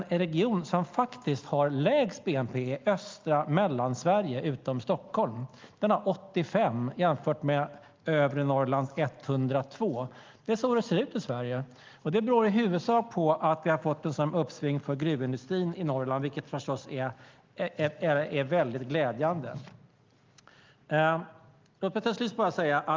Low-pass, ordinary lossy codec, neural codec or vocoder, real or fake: 7.2 kHz; Opus, 32 kbps; none; real